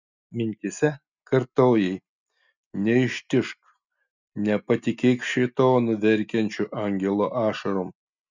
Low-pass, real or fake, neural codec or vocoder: 7.2 kHz; real; none